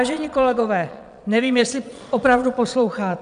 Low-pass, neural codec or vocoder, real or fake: 9.9 kHz; vocoder, 22.05 kHz, 80 mel bands, Vocos; fake